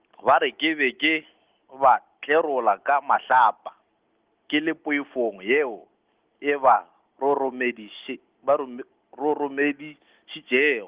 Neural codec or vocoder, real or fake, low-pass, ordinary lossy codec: none; real; 3.6 kHz; Opus, 16 kbps